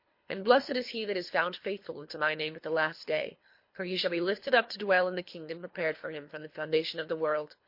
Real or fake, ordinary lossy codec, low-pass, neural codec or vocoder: fake; MP3, 32 kbps; 5.4 kHz; codec, 24 kHz, 3 kbps, HILCodec